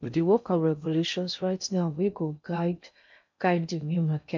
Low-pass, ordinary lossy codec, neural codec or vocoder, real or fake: 7.2 kHz; MP3, 64 kbps; codec, 16 kHz in and 24 kHz out, 0.6 kbps, FocalCodec, streaming, 2048 codes; fake